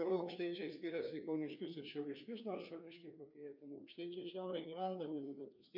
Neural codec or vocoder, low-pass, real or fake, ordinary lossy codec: codec, 16 kHz, 2 kbps, FreqCodec, larger model; 5.4 kHz; fake; MP3, 48 kbps